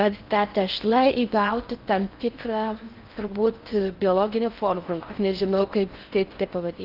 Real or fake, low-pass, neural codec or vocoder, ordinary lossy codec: fake; 5.4 kHz; codec, 16 kHz in and 24 kHz out, 0.6 kbps, FocalCodec, streaming, 4096 codes; Opus, 24 kbps